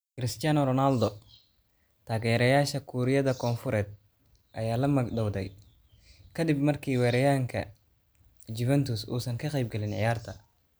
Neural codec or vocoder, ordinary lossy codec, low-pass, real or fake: none; none; none; real